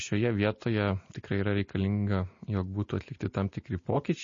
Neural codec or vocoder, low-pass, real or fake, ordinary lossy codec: none; 7.2 kHz; real; MP3, 32 kbps